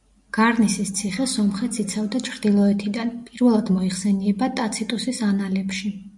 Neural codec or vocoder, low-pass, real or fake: none; 10.8 kHz; real